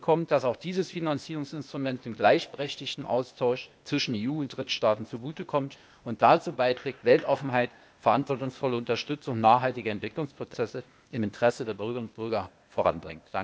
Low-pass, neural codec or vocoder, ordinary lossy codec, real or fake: none; codec, 16 kHz, 0.8 kbps, ZipCodec; none; fake